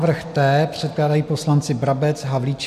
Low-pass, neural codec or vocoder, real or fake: 14.4 kHz; none; real